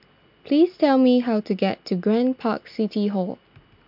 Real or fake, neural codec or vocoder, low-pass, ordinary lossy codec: real; none; 5.4 kHz; MP3, 48 kbps